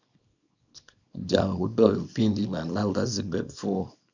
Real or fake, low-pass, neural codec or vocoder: fake; 7.2 kHz; codec, 24 kHz, 0.9 kbps, WavTokenizer, small release